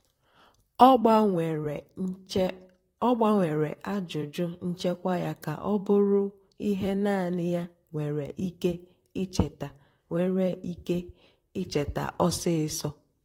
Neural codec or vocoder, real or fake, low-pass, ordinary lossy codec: vocoder, 44.1 kHz, 128 mel bands, Pupu-Vocoder; fake; 19.8 kHz; AAC, 48 kbps